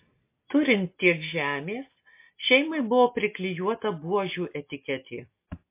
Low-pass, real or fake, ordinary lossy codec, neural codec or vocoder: 3.6 kHz; real; MP3, 24 kbps; none